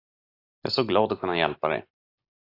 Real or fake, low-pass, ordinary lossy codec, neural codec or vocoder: real; 5.4 kHz; AAC, 32 kbps; none